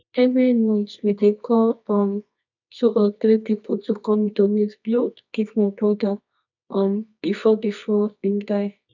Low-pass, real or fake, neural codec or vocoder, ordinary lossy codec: 7.2 kHz; fake; codec, 24 kHz, 0.9 kbps, WavTokenizer, medium music audio release; none